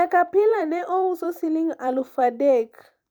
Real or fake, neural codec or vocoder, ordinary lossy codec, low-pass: fake; vocoder, 44.1 kHz, 128 mel bands every 256 samples, BigVGAN v2; none; none